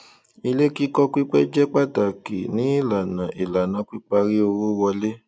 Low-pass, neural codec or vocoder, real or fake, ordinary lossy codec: none; none; real; none